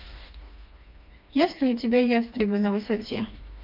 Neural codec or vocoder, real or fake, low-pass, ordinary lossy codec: codec, 16 kHz, 2 kbps, FreqCodec, smaller model; fake; 5.4 kHz; MP3, 48 kbps